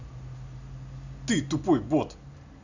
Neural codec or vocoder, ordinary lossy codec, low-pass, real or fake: none; none; 7.2 kHz; real